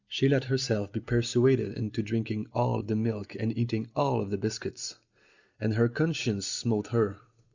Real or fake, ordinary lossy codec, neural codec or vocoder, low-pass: real; Opus, 64 kbps; none; 7.2 kHz